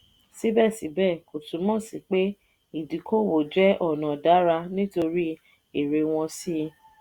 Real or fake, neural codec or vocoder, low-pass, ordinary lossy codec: real; none; 19.8 kHz; Opus, 64 kbps